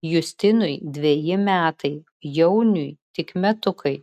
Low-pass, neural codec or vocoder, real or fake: 14.4 kHz; none; real